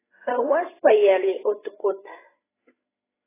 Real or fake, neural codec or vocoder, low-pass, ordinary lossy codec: fake; codec, 16 kHz, 8 kbps, FreqCodec, larger model; 3.6 kHz; AAC, 16 kbps